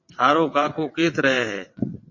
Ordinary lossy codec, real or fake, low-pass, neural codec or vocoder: MP3, 32 kbps; fake; 7.2 kHz; vocoder, 22.05 kHz, 80 mel bands, WaveNeXt